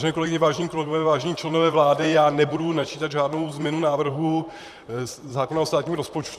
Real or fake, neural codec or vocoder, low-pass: fake; vocoder, 44.1 kHz, 128 mel bands, Pupu-Vocoder; 14.4 kHz